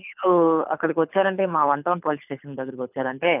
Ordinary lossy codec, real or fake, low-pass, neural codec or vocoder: none; fake; 3.6 kHz; codec, 24 kHz, 6 kbps, HILCodec